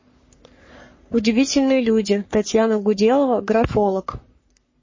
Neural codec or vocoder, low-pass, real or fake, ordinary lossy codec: codec, 44.1 kHz, 3.4 kbps, Pupu-Codec; 7.2 kHz; fake; MP3, 32 kbps